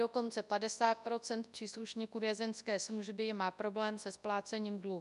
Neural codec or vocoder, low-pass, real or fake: codec, 24 kHz, 0.9 kbps, WavTokenizer, large speech release; 10.8 kHz; fake